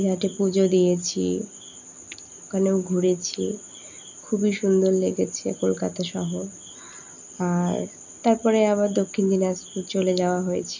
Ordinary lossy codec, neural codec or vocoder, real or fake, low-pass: none; none; real; 7.2 kHz